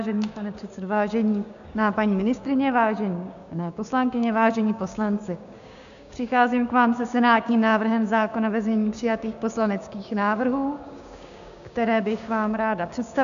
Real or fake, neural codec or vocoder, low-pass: fake; codec, 16 kHz, 6 kbps, DAC; 7.2 kHz